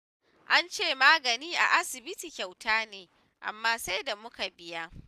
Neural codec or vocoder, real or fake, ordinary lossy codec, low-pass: none; real; none; 14.4 kHz